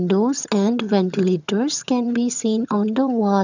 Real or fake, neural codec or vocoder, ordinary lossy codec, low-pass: fake; vocoder, 22.05 kHz, 80 mel bands, HiFi-GAN; none; 7.2 kHz